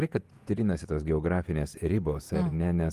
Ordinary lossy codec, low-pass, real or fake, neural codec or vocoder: Opus, 24 kbps; 14.4 kHz; fake; vocoder, 48 kHz, 128 mel bands, Vocos